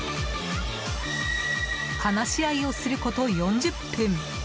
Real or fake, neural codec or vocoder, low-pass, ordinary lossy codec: real; none; none; none